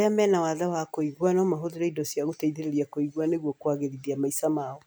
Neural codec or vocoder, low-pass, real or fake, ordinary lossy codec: none; none; real; none